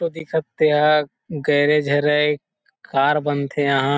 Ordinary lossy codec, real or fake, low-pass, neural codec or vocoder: none; real; none; none